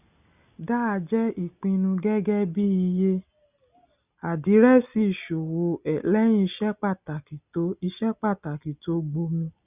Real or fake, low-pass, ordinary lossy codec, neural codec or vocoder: real; 3.6 kHz; none; none